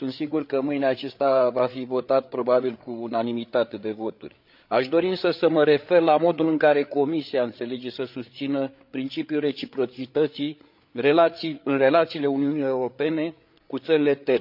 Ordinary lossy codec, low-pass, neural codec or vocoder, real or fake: none; 5.4 kHz; codec, 16 kHz, 8 kbps, FreqCodec, larger model; fake